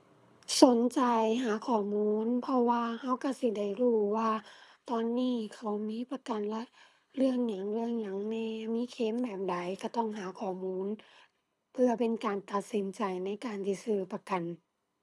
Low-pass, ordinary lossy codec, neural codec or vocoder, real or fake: none; none; codec, 24 kHz, 6 kbps, HILCodec; fake